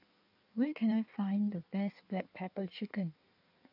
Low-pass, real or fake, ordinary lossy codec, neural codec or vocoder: 5.4 kHz; fake; none; codec, 16 kHz in and 24 kHz out, 1.1 kbps, FireRedTTS-2 codec